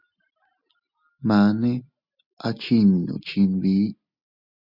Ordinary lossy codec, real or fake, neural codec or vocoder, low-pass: Opus, 64 kbps; real; none; 5.4 kHz